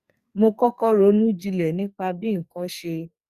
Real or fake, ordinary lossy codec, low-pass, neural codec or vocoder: fake; Opus, 64 kbps; 14.4 kHz; codec, 44.1 kHz, 2.6 kbps, SNAC